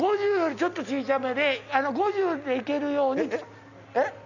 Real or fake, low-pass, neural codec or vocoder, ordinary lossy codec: real; 7.2 kHz; none; none